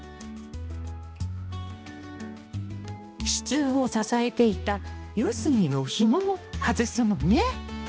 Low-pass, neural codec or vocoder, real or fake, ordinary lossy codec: none; codec, 16 kHz, 1 kbps, X-Codec, HuBERT features, trained on balanced general audio; fake; none